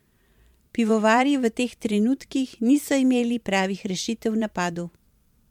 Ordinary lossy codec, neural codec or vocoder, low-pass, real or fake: MP3, 96 kbps; vocoder, 44.1 kHz, 128 mel bands every 512 samples, BigVGAN v2; 19.8 kHz; fake